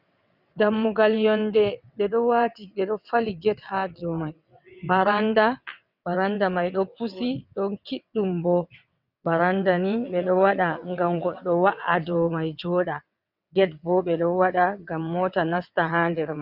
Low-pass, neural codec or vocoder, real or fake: 5.4 kHz; vocoder, 22.05 kHz, 80 mel bands, WaveNeXt; fake